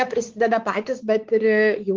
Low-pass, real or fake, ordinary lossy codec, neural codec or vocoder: 7.2 kHz; fake; Opus, 16 kbps; codec, 16 kHz, 4 kbps, X-Codec, WavLM features, trained on Multilingual LibriSpeech